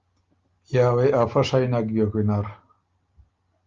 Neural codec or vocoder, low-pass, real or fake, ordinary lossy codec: none; 7.2 kHz; real; Opus, 32 kbps